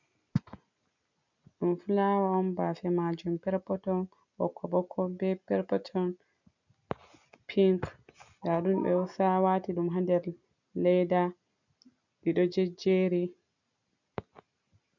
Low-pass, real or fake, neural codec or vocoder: 7.2 kHz; real; none